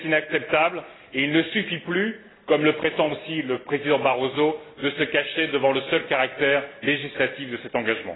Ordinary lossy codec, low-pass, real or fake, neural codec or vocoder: AAC, 16 kbps; 7.2 kHz; real; none